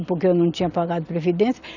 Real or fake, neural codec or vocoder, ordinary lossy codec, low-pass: real; none; none; 7.2 kHz